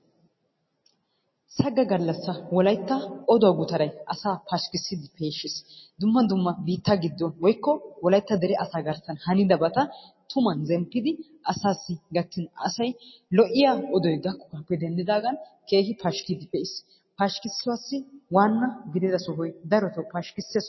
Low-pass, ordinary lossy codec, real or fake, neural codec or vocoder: 7.2 kHz; MP3, 24 kbps; real; none